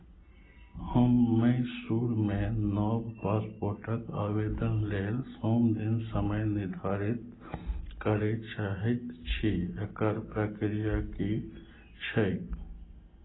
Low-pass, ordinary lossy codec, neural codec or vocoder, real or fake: 7.2 kHz; AAC, 16 kbps; none; real